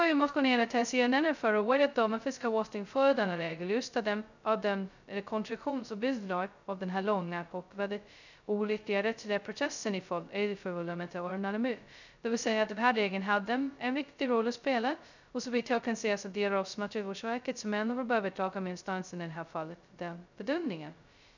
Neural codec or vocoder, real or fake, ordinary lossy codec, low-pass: codec, 16 kHz, 0.2 kbps, FocalCodec; fake; none; 7.2 kHz